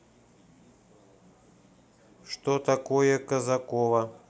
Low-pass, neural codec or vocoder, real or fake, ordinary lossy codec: none; none; real; none